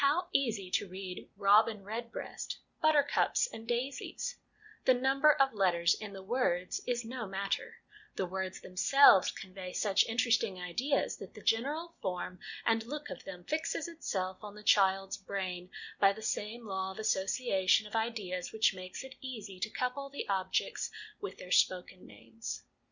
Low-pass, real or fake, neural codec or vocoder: 7.2 kHz; real; none